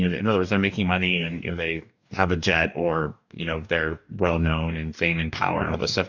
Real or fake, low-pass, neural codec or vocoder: fake; 7.2 kHz; codec, 44.1 kHz, 2.6 kbps, DAC